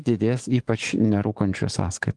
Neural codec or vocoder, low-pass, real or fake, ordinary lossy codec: codec, 44.1 kHz, 7.8 kbps, DAC; 10.8 kHz; fake; Opus, 24 kbps